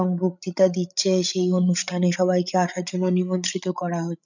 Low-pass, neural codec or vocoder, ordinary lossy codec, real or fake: 7.2 kHz; codec, 16 kHz, 16 kbps, FreqCodec, larger model; none; fake